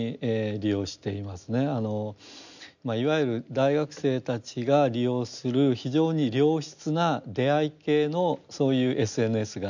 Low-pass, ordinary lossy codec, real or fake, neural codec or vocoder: 7.2 kHz; none; real; none